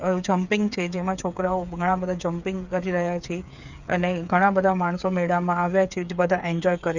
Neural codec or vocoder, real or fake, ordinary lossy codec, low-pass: codec, 16 kHz, 8 kbps, FreqCodec, smaller model; fake; none; 7.2 kHz